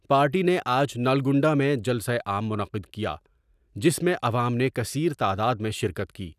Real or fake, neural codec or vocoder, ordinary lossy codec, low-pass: real; none; none; 14.4 kHz